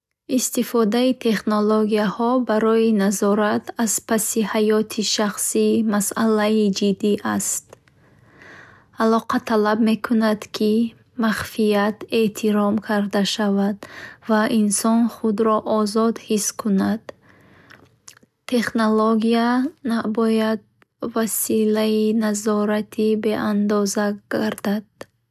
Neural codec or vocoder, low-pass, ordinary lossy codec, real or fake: none; 14.4 kHz; none; real